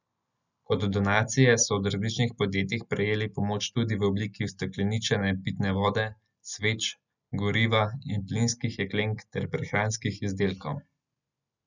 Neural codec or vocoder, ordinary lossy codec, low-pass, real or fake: none; none; 7.2 kHz; real